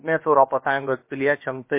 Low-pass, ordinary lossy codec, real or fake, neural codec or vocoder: 3.6 kHz; MP3, 24 kbps; fake; codec, 16 kHz, about 1 kbps, DyCAST, with the encoder's durations